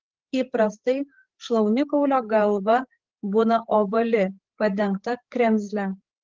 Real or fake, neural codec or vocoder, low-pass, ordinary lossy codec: fake; codec, 16 kHz, 8 kbps, FreqCodec, larger model; 7.2 kHz; Opus, 16 kbps